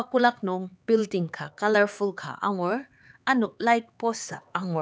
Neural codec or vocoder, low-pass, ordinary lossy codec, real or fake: codec, 16 kHz, 4 kbps, X-Codec, HuBERT features, trained on LibriSpeech; none; none; fake